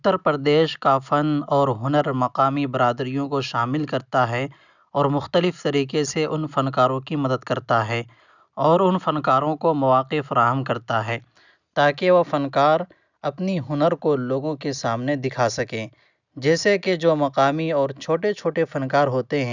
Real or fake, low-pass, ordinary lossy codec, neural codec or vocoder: real; 7.2 kHz; none; none